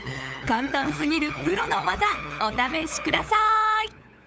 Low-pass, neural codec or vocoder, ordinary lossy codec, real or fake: none; codec, 16 kHz, 8 kbps, FunCodec, trained on LibriTTS, 25 frames a second; none; fake